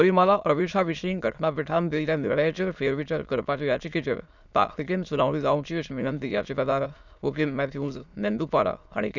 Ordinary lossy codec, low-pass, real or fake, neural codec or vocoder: none; 7.2 kHz; fake; autoencoder, 22.05 kHz, a latent of 192 numbers a frame, VITS, trained on many speakers